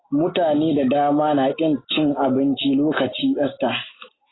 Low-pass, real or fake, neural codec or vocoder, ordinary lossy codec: 7.2 kHz; real; none; AAC, 16 kbps